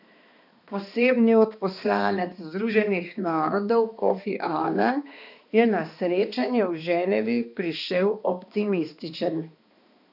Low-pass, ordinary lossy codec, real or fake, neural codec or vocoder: 5.4 kHz; none; fake; codec, 16 kHz, 2 kbps, X-Codec, HuBERT features, trained on balanced general audio